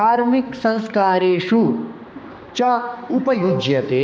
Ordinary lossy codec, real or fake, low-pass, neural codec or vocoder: none; fake; none; codec, 16 kHz, 2 kbps, X-Codec, HuBERT features, trained on balanced general audio